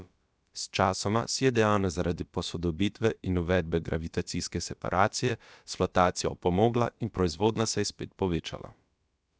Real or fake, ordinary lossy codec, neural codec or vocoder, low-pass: fake; none; codec, 16 kHz, about 1 kbps, DyCAST, with the encoder's durations; none